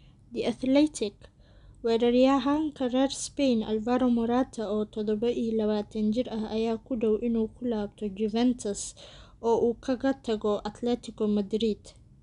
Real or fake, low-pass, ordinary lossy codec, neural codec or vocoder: real; 10.8 kHz; none; none